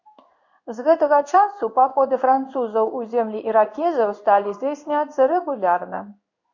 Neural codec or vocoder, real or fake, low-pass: codec, 16 kHz in and 24 kHz out, 1 kbps, XY-Tokenizer; fake; 7.2 kHz